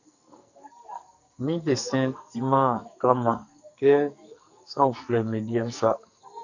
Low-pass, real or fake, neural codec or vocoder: 7.2 kHz; fake; codec, 44.1 kHz, 2.6 kbps, SNAC